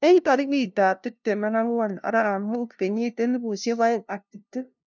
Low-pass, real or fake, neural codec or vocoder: 7.2 kHz; fake; codec, 16 kHz, 0.5 kbps, FunCodec, trained on LibriTTS, 25 frames a second